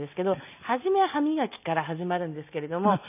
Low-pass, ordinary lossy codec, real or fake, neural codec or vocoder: 3.6 kHz; none; real; none